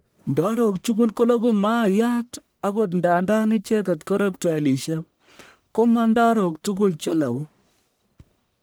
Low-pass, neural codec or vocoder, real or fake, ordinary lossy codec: none; codec, 44.1 kHz, 1.7 kbps, Pupu-Codec; fake; none